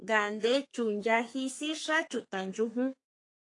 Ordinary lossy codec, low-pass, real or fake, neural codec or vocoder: AAC, 48 kbps; 10.8 kHz; fake; codec, 32 kHz, 1.9 kbps, SNAC